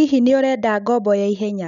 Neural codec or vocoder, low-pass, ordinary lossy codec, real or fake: none; 7.2 kHz; none; real